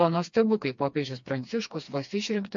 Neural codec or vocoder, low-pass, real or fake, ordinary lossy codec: codec, 16 kHz, 2 kbps, FreqCodec, smaller model; 7.2 kHz; fake; MP3, 48 kbps